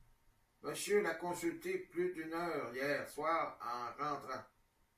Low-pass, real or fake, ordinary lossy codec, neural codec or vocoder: 14.4 kHz; real; AAC, 96 kbps; none